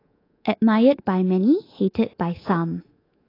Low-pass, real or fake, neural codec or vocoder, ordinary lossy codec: 5.4 kHz; fake; codec, 24 kHz, 3.1 kbps, DualCodec; AAC, 24 kbps